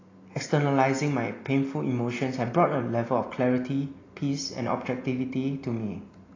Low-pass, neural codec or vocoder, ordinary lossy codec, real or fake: 7.2 kHz; none; AAC, 32 kbps; real